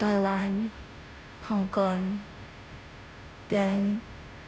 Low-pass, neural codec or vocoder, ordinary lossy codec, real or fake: none; codec, 16 kHz, 0.5 kbps, FunCodec, trained on Chinese and English, 25 frames a second; none; fake